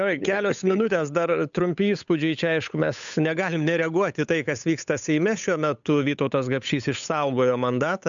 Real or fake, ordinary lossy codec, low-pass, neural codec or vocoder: fake; MP3, 96 kbps; 7.2 kHz; codec, 16 kHz, 8 kbps, FunCodec, trained on Chinese and English, 25 frames a second